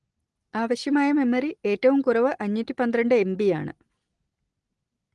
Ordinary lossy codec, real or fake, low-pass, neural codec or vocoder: Opus, 24 kbps; real; 10.8 kHz; none